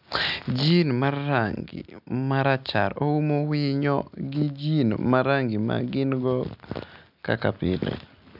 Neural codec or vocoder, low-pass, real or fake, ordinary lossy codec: none; 5.4 kHz; real; none